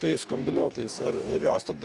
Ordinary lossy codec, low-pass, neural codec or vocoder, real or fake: Opus, 64 kbps; 10.8 kHz; codec, 44.1 kHz, 2.6 kbps, DAC; fake